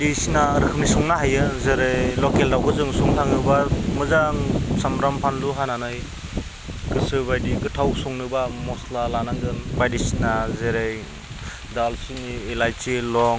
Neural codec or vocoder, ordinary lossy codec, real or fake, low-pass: none; none; real; none